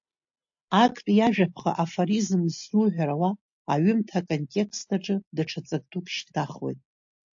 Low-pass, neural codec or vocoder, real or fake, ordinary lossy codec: 7.2 kHz; none; real; AAC, 64 kbps